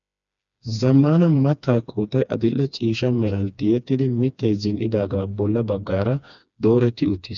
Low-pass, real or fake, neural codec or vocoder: 7.2 kHz; fake; codec, 16 kHz, 2 kbps, FreqCodec, smaller model